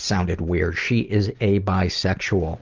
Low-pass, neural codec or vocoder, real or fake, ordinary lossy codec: 7.2 kHz; none; real; Opus, 32 kbps